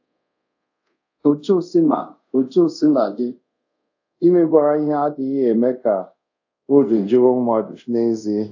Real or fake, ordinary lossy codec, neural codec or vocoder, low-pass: fake; AAC, 48 kbps; codec, 24 kHz, 0.5 kbps, DualCodec; 7.2 kHz